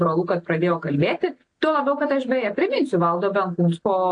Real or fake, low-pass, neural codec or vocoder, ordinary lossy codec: real; 9.9 kHz; none; AAC, 48 kbps